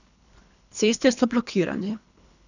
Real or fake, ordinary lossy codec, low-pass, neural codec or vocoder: fake; none; 7.2 kHz; codec, 24 kHz, 3 kbps, HILCodec